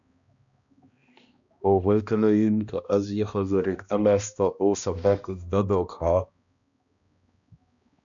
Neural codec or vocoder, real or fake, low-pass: codec, 16 kHz, 1 kbps, X-Codec, HuBERT features, trained on balanced general audio; fake; 7.2 kHz